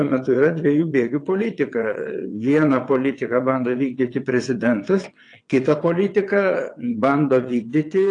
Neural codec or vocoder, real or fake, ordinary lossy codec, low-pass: vocoder, 22.05 kHz, 80 mel bands, WaveNeXt; fake; AAC, 48 kbps; 9.9 kHz